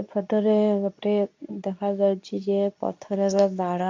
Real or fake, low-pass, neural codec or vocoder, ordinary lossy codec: fake; 7.2 kHz; codec, 24 kHz, 0.9 kbps, WavTokenizer, medium speech release version 2; MP3, 64 kbps